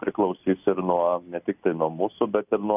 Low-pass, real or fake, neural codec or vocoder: 3.6 kHz; real; none